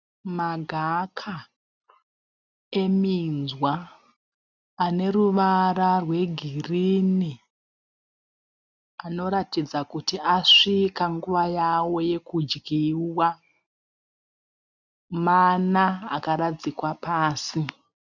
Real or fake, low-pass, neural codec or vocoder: real; 7.2 kHz; none